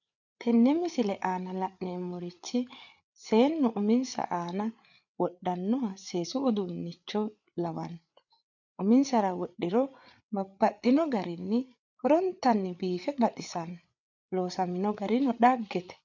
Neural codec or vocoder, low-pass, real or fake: codec, 16 kHz, 8 kbps, FreqCodec, larger model; 7.2 kHz; fake